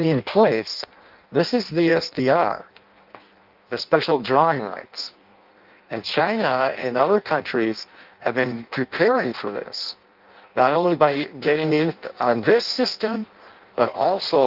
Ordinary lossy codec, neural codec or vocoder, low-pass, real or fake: Opus, 24 kbps; codec, 16 kHz in and 24 kHz out, 0.6 kbps, FireRedTTS-2 codec; 5.4 kHz; fake